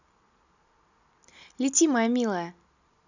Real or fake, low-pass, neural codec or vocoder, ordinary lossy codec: real; 7.2 kHz; none; none